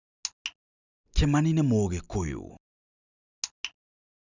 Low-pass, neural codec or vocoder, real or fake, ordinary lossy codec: 7.2 kHz; none; real; none